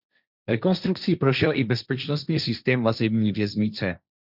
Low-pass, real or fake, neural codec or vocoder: 5.4 kHz; fake; codec, 16 kHz, 1.1 kbps, Voila-Tokenizer